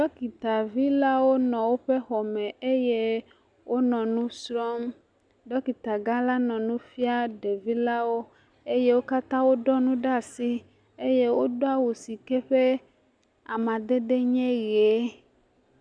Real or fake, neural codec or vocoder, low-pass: real; none; 9.9 kHz